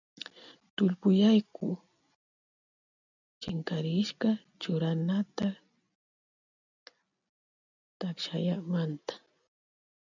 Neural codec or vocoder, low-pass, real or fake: none; 7.2 kHz; real